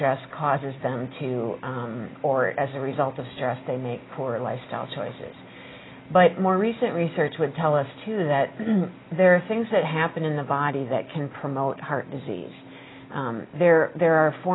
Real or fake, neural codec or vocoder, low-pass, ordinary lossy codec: real; none; 7.2 kHz; AAC, 16 kbps